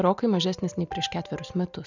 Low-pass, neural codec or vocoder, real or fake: 7.2 kHz; none; real